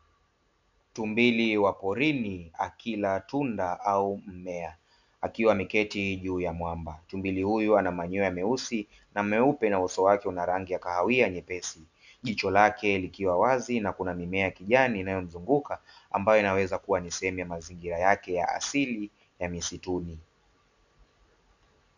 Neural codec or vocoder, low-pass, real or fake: none; 7.2 kHz; real